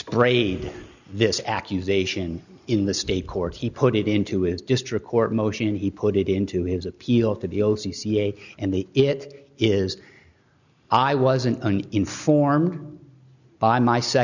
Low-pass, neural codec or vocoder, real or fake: 7.2 kHz; none; real